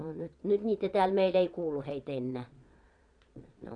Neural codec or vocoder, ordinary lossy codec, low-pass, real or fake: vocoder, 22.05 kHz, 80 mel bands, Vocos; none; 9.9 kHz; fake